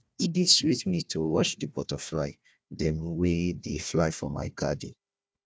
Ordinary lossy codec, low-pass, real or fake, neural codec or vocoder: none; none; fake; codec, 16 kHz, 1 kbps, FunCodec, trained on Chinese and English, 50 frames a second